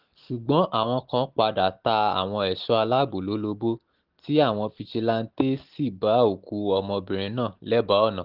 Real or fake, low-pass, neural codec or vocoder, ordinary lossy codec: real; 5.4 kHz; none; Opus, 32 kbps